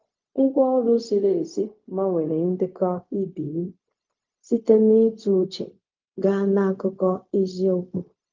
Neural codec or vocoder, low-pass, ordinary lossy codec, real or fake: codec, 16 kHz, 0.4 kbps, LongCat-Audio-Codec; 7.2 kHz; Opus, 24 kbps; fake